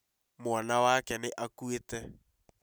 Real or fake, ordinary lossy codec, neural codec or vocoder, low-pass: real; none; none; none